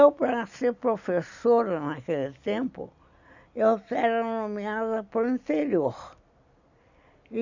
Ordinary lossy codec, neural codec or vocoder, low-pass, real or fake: none; none; 7.2 kHz; real